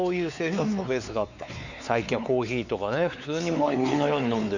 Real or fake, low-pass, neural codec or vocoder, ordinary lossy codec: fake; 7.2 kHz; codec, 16 kHz, 4 kbps, X-Codec, WavLM features, trained on Multilingual LibriSpeech; none